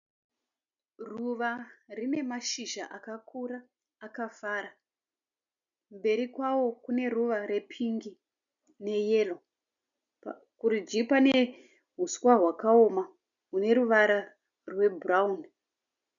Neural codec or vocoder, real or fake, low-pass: none; real; 7.2 kHz